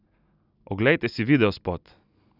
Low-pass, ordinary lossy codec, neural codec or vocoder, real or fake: 5.4 kHz; none; none; real